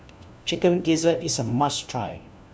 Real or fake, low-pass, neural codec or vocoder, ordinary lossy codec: fake; none; codec, 16 kHz, 1 kbps, FunCodec, trained on LibriTTS, 50 frames a second; none